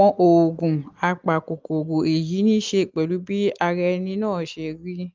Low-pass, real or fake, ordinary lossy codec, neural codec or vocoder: 7.2 kHz; real; Opus, 24 kbps; none